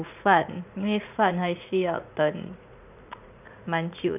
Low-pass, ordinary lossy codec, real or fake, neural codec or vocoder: 3.6 kHz; none; fake; vocoder, 44.1 kHz, 80 mel bands, Vocos